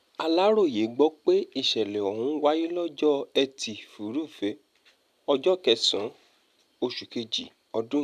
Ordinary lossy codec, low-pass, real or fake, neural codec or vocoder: none; 14.4 kHz; real; none